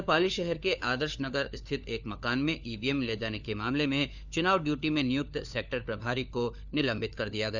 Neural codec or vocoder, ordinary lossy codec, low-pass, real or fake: codec, 16 kHz, 4 kbps, FunCodec, trained on LibriTTS, 50 frames a second; none; 7.2 kHz; fake